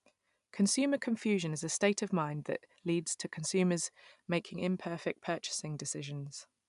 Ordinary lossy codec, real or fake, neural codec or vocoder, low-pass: none; real; none; 10.8 kHz